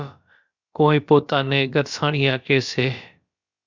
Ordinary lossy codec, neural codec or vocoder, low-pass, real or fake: Opus, 64 kbps; codec, 16 kHz, about 1 kbps, DyCAST, with the encoder's durations; 7.2 kHz; fake